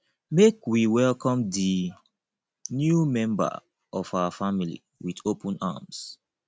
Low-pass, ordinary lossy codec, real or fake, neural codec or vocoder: none; none; real; none